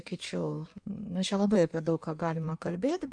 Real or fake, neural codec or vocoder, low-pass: fake; codec, 16 kHz in and 24 kHz out, 1.1 kbps, FireRedTTS-2 codec; 9.9 kHz